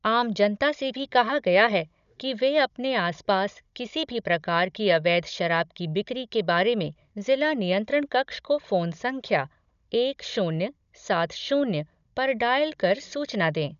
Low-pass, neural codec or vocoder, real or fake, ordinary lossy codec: 7.2 kHz; codec, 16 kHz, 16 kbps, FunCodec, trained on Chinese and English, 50 frames a second; fake; none